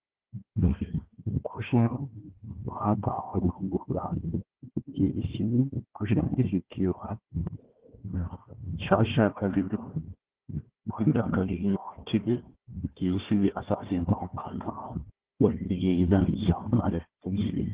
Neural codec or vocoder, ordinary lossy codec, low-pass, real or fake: codec, 16 kHz, 1 kbps, FunCodec, trained on Chinese and English, 50 frames a second; Opus, 16 kbps; 3.6 kHz; fake